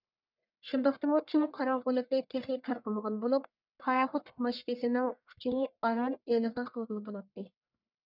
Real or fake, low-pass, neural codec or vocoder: fake; 5.4 kHz; codec, 44.1 kHz, 1.7 kbps, Pupu-Codec